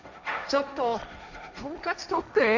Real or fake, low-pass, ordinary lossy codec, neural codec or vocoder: fake; 7.2 kHz; none; codec, 16 kHz, 1.1 kbps, Voila-Tokenizer